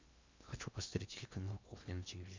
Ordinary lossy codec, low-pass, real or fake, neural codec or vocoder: MP3, 64 kbps; 7.2 kHz; fake; codec, 16 kHz in and 24 kHz out, 0.8 kbps, FocalCodec, streaming, 65536 codes